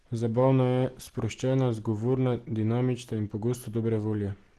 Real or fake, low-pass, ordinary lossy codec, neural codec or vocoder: real; 14.4 kHz; Opus, 16 kbps; none